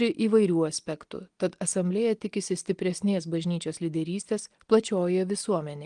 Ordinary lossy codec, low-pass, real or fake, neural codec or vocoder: Opus, 24 kbps; 9.9 kHz; real; none